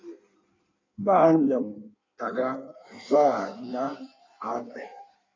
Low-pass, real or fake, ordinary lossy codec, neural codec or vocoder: 7.2 kHz; fake; AAC, 32 kbps; codec, 16 kHz in and 24 kHz out, 1.1 kbps, FireRedTTS-2 codec